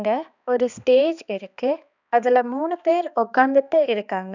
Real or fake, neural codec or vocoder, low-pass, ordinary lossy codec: fake; codec, 16 kHz, 2 kbps, X-Codec, HuBERT features, trained on balanced general audio; 7.2 kHz; none